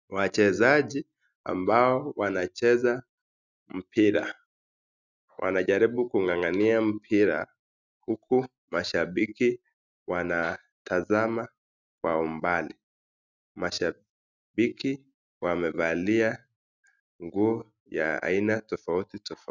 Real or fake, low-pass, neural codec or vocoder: real; 7.2 kHz; none